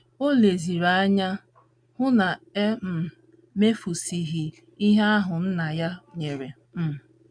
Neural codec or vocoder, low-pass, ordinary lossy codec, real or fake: none; 9.9 kHz; none; real